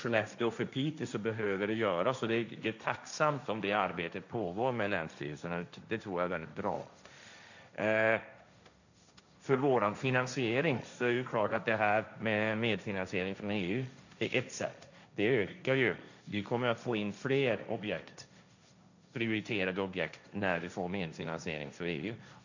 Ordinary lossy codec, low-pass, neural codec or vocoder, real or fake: none; 7.2 kHz; codec, 16 kHz, 1.1 kbps, Voila-Tokenizer; fake